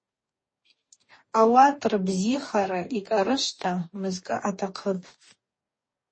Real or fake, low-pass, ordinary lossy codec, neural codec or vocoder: fake; 9.9 kHz; MP3, 32 kbps; codec, 44.1 kHz, 2.6 kbps, DAC